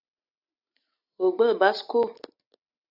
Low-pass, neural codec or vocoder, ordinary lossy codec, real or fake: 5.4 kHz; none; AAC, 48 kbps; real